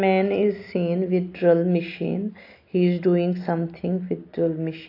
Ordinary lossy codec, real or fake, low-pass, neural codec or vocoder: AAC, 32 kbps; real; 5.4 kHz; none